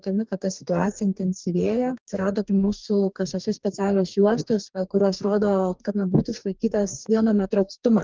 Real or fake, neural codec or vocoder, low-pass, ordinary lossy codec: fake; codec, 44.1 kHz, 2.6 kbps, DAC; 7.2 kHz; Opus, 32 kbps